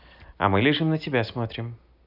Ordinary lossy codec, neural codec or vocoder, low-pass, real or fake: none; none; 5.4 kHz; real